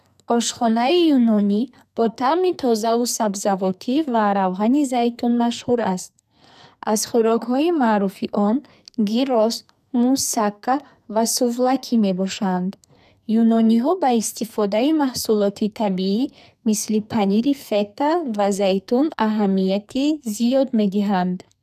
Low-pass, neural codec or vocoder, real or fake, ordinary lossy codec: 14.4 kHz; codec, 44.1 kHz, 2.6 kbps, SNAC; fake; none